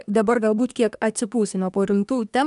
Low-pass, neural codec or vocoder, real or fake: 10.8 kHz; codec, 24 kHz, 1 kbps, SNAC; fake